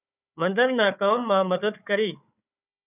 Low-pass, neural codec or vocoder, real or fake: 3.6 kHz; codec, 16 kHz, 4 kbps, FunCodec, trained on Chinese and English, 50 frames a second; fake